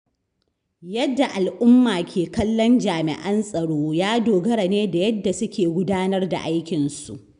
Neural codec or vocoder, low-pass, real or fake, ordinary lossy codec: none; 9.9 kHz; real; none